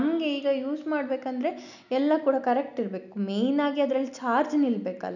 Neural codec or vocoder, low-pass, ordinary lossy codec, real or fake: none; 7.2 kHz; none; real